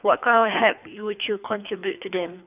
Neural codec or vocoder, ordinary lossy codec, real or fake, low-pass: codec, 24 kHz, 3 kbps, HILCodec; none; fake; 3.6 kHz